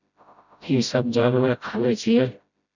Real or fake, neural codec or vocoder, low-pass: fake; codec, 16 kHz, 0.5 kbps, FreqCodec, smaller model; 7.2 kHz